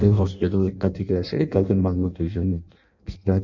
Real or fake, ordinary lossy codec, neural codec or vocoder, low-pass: fake; none; codec, 16 kHz in and 24 kHz out, 0.6 kbps, FireRedTTS-2 codec; 7.2 kHz